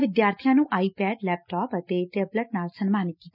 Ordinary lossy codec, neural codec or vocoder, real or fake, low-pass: none; none; real; 5.4 kHz